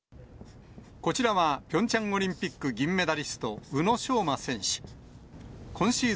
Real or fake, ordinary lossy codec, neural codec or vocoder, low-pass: real; none; none; none